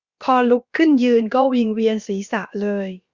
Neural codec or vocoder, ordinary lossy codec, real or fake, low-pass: codec, 16 kHz, about 1 kbps, DyCAST, with the encoder's durations; Opus, 64 kbps; fake; 7.2 kHz